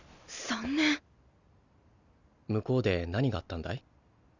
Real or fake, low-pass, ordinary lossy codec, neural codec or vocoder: real; 7.2 kHz; none; none